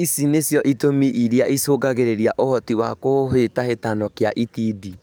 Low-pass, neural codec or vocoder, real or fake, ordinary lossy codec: none; codec, 44.1 kHz, 7.8 kbps, DAC; fake; none